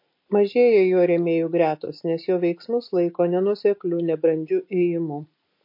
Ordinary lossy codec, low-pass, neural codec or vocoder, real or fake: MP3, 32 kbps; 5.4 kHz; none; real